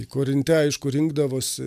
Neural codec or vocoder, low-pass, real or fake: none; 14.4 kHz; real